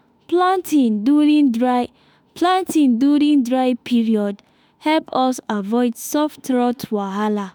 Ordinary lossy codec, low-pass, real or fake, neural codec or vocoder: none; none; fake; autoencoder, 48 kHz, 32 numbers a frame, DAC-VAE, trained on Japanese speech